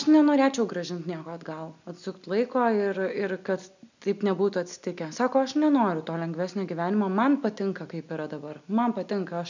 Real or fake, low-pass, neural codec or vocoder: real; 7.2 kHz; none